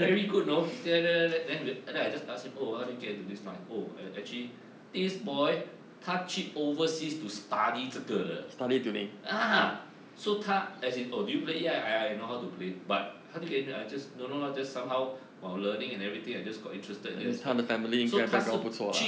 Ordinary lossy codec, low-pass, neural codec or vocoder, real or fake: none; none; none; real